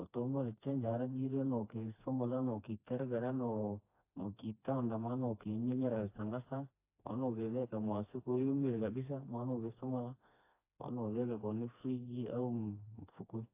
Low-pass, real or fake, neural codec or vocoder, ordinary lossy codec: 3.6 kHz; fake; codec, 16 kHz, 2 kbps, FreqCodec, smaller model; AAC, 32 kbps